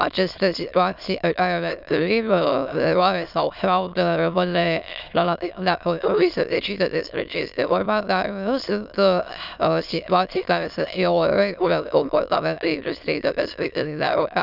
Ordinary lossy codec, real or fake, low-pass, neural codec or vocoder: none; fake; 5.4 kHz; autoencoder, 22.05 kHz, a latent of 192 numbers a frame, VITS, trained on many speakers